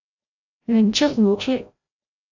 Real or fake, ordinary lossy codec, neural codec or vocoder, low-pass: fake; AAC, 48 kbps; codec, 16 kHz, 0.5 kbps, FreqCodec, larger model; 7.2 kHz